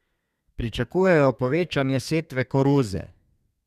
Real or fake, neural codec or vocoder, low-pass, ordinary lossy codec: fake; codec, 32 kHz, 1.9 kbps, SNAC; 14.4 kHz; none